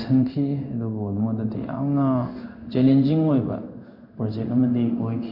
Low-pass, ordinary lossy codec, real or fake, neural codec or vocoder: 5.4 kHz; none; fake; codec, 16 kHz in and 24 kHz out, 1 kbps, XY-Tokenizer